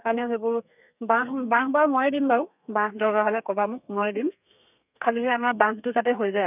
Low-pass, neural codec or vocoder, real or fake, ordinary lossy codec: 3.6 kHz; codec, 32 kHz, 1.9 kbps, SNAC; fake; none